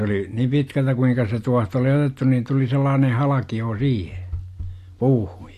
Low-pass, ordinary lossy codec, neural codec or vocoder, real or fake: 14.4 kHz; AAC, 64 kbps; none; real